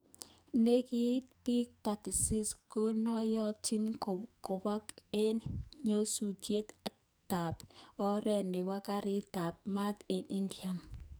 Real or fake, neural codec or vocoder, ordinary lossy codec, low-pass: fake; codec, 44.1 kHz, 2.6 kbps, SNAC; none; none